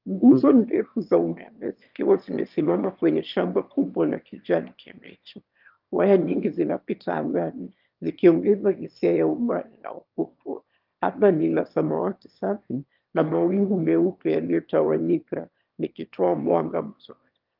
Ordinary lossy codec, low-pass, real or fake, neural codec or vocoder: Opus, 24 kbps; 5.4 kHz; fake; autoencoder, 22.05 kHz, a latent of 192 numbers a frame, VITS, trained on one speaker